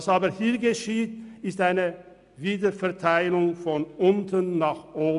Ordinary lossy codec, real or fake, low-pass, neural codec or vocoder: none; real; 10.8 kHz; none